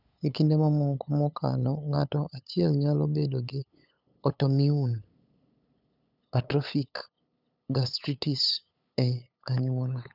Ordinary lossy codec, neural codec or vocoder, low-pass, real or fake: none; codec, 16 kHz, 8 kbps, FunCodec, trained on LibriTTS, 25 frames a second; 5.4 kHz; fake